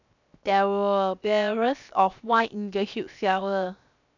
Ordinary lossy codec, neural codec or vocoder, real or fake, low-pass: none; codec, 16 kHz, 0.7 kbps, FocalCodec; fake; 7.2 kHz